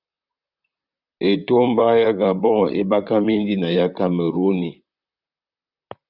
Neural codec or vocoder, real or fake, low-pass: vocoder, 44.1 kHz, 128 mel bands, Pupu-Vocoder; fake; 5.4 kHz